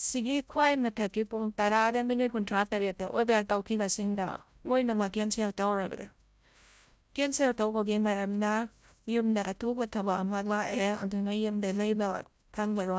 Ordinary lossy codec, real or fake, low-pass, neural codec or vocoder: none; fake; none; codec, 16 kHz, 0.5 kbps, FreqCodec, larger model